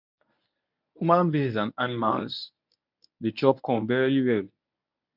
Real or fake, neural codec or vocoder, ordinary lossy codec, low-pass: fake; codec, 24 kHz, 0.9 kbps, WavTokenizer, medium speech release version 1; none; 5.4 kHz